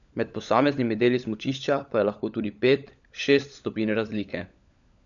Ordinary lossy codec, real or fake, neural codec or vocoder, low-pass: none; fake; codec, 16 kHz, 16 kbps, FunCodec, trained on LibriTTS, 50 frames a second; 7.2 kHz